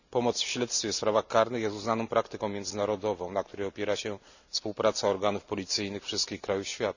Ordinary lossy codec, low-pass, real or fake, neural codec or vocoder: none; 7.2 kHz; real; none